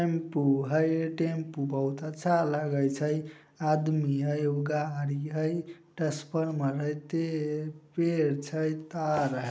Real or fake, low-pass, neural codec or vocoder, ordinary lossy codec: real; none; none; none